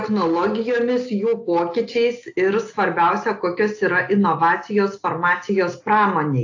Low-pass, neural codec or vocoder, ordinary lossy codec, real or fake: 7.2 kHz; none; AAC, 48 kbps; real